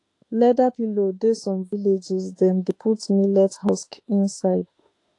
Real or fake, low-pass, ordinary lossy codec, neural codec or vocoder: fake; 10.8 kHz; AAC, 48 kbps; autoencoder, 48 kHz, 32 numbers a frame, DAC-VAE, trained on Japanese speech